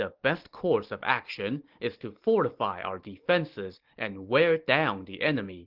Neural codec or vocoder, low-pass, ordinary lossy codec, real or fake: none; 5.4 kHz; Opus, 16 kbps; real